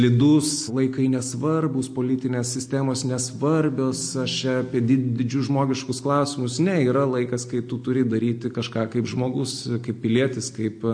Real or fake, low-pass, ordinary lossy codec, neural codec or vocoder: real; 9.9 kHz; MP3, 48 kbps; none